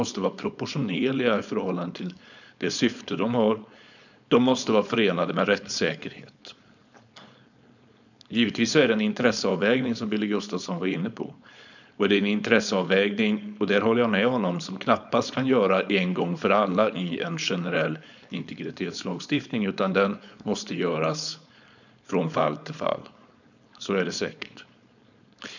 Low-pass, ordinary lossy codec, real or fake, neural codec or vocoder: 7.2 kHz; none; fake; codec, 16 kHz, 4.8 kbps, FACodec